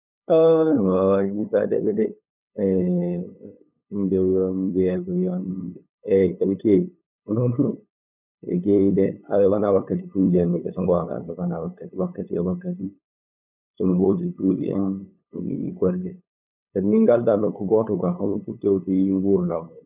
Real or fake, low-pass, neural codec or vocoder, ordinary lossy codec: fake; 3.6 kHz; codec, 16 kHz, 8 kbps, FunCodec, trained on LibriTTS, 25 frames a second; none